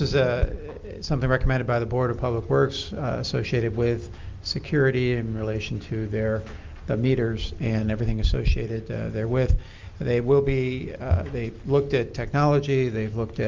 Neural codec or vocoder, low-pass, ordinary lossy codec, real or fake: none; 7.2 kHz; Opus, 24 kbps; real